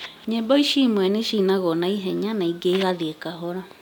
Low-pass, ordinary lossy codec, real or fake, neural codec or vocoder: 19.8 kHz; none; real; none